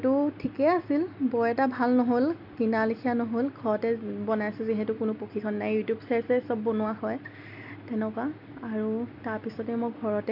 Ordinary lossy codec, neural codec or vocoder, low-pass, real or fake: MP3, 48 kbps; none; 5.4 kHz; real